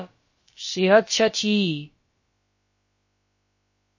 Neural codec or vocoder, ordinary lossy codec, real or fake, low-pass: codec, 16 kHz, about 1 kbps, DyCAST, with the encoder's durations; MP3, 32 kbps; fake; 7.2 kHz